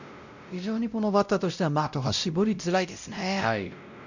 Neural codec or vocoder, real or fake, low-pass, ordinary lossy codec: codec, 16 kHz, 0.5 kbps, X-Codec, WavLM features, trained on Multilingual LibriSpeech; fake; 7.2 kHz; none